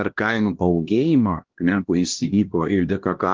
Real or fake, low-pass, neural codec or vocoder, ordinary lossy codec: fake; 7.2 kHz; codec, 16 kHz, 1 kbps, X-Codec, HuBERT features, trained on LibriSpeech; Opus, 16 kbps